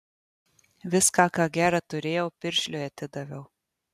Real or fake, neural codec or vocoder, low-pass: real; none; 14.4 kHz